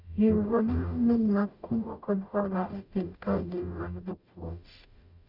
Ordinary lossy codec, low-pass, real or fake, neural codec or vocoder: none; 5.4 kHz; fake; codec, 44.1 kHz, 0.9 kbps, DAC